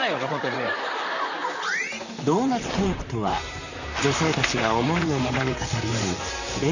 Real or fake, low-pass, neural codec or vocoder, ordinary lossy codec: fake; 7.2 kHz; vocoder, 44.1 kHz, 128 mel bands, Pupu-Vocoder; none